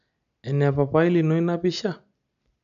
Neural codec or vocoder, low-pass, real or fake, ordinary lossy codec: none; 7.2 kHz; real; none